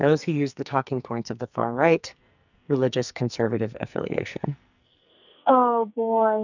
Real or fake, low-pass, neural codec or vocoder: fake; 7.2 kHz; codec, 44.1 kHz, 2.6 kbps, SNAC